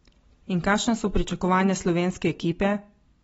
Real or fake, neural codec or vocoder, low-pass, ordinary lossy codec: real; none; 19.8 kHz; AAC, 24 kbps